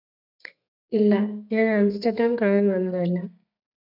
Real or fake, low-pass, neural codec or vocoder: fake; 5.4 kHz; codec, 32 kHz, 1.9 kbps, SNAC